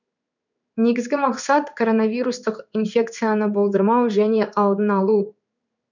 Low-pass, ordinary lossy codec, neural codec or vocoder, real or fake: 7.2 kHz; none; codec, 16 kHz in and 24 kHz out, 1 kbps, XY-Tokenizer; fake